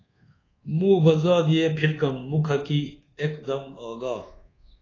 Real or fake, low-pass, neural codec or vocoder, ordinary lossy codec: fake; 7.2 kHz; codec, 24 kHz, 1.2 kbps, DualCodec; AAC, 32 kbps